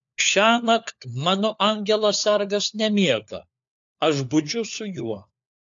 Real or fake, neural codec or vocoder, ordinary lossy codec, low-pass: fake; codec, 16 kHz, 4 kbps, FunCodec, trained on LibriTTS, 50 frames a second; AAC, 64 kbps; 7.2 kHz